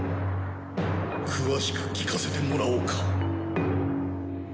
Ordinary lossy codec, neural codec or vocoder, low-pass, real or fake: none; none; none; real